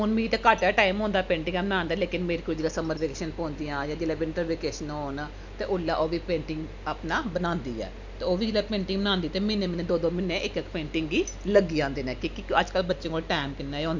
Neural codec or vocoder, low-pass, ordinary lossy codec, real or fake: none; 7.2 kHz; none; real